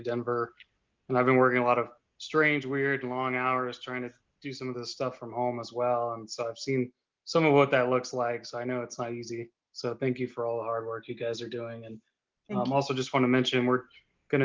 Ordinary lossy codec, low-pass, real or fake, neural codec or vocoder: Opus, 32 kbps; 7.2 kHz; real; none